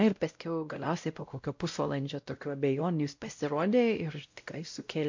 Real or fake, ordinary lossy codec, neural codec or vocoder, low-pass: fake; MP3, 48 kbps; codec, 16 kHz, 0.5 kbps, X-Codec, WavLM features, trained on Multilingual LibriSpeech; 7.2 kHz